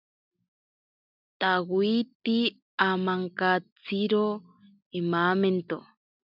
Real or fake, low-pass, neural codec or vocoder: real; 5.4 kHz; none